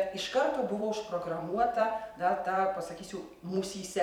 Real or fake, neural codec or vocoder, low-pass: fake; vocoder, 44.1 kHz, 128 mel bands every 256 samples, BigVGAN v2; 19.8 kHz